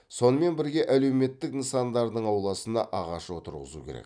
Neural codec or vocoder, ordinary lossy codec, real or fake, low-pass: none; none; real; 9.9 kHz